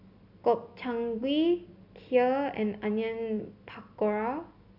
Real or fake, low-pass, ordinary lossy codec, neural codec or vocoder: real; 5.4 kHz; none; none